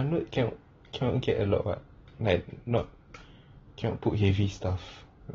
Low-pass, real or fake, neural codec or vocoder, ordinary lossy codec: 7.2 kHz; real; none; AAC, 24 kbps